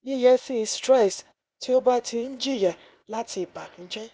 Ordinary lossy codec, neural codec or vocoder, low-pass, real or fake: none; codec, 16 kHz, 0.8 kbps, ZipCodec; none; fake